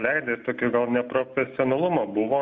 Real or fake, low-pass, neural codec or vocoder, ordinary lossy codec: real; 7.2 kHz; none; AAC, 48 kbps